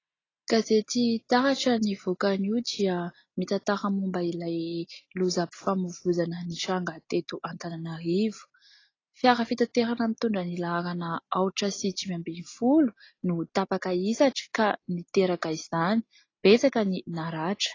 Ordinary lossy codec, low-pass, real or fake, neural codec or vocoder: AAC, 32 kbps; 7.2 kHz; real; none